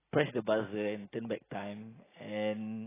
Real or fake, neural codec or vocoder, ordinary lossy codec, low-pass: real; none; AAC, 16 kbps; 3.6 kHz